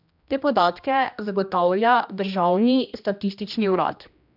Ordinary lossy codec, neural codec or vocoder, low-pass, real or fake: none; codec, 16 kHz, 1 kbps, X-Codec, HuBERT features, trained on general audio; 5.4 kHz; fake